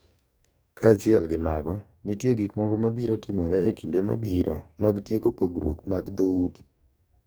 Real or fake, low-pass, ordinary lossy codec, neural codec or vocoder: fake; none; none; codec, 44.1 kHz, 2.6 kbps, DAC